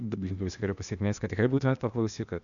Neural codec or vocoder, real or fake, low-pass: codec, 16 kHz, 0.8 kbps, ZipCodec; fake; 7.2 kHz